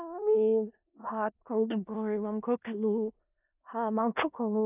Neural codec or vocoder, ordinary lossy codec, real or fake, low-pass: codec, 16 kHz in and 24 kHz out, 0.4 kbps, LongCat-Audio-Codec, four codebook decoder; none; fake; 3.6 kHz